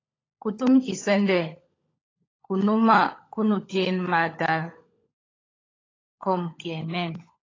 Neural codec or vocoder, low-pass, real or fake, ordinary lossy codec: codec, 16 kHz, 16 kbps, FunCodec, trained on LibriTTS, 50 frames a second; 7.2 kHz; fake; AAC, 32 kbps